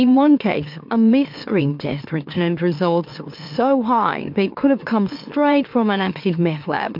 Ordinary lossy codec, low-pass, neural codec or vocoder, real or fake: MP3, 48 kbps; 5.4 kHz; autoencoder, 44.1 kHz, a latent of 192 numbers a frame, MeloTTS; fake